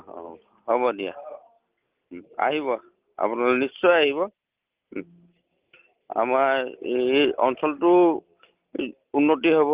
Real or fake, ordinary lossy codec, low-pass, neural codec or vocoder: real; Opus, 32 kbps; 3.6 kHz; none